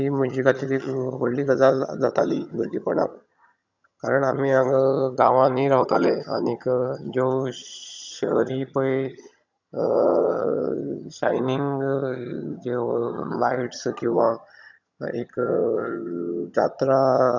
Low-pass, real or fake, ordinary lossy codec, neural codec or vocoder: 7.2 kHz; fake; none; vocoder, 22.05 kHz, 80 mel bands, HiFi-GAN